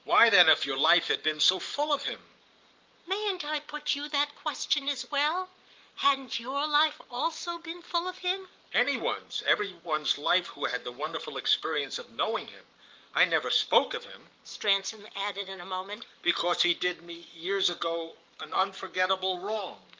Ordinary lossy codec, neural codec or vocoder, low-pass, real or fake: Opus, 32 kbps; codec, 44.1 kHz, 7.8 kbps, Pupu-Codec; 7.2 kHz; fake